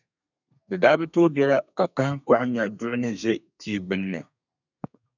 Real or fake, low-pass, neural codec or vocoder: fake; 7.2 kHz; codec, 32 kHz, 1.9 kbps, SNAC